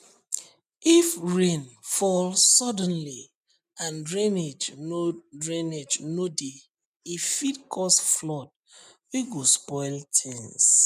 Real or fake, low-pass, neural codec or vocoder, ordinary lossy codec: real; 14.4 kHz; none; none